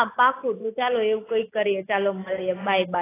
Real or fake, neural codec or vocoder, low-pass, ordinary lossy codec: real; none; 3.6 kHz; AAC, 16 kbps